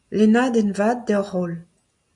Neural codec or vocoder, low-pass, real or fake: none; 10.8 kHz; real